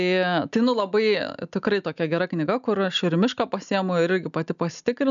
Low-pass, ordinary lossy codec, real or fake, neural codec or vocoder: 7.2 kHz; MP3, 96 kbps; real; none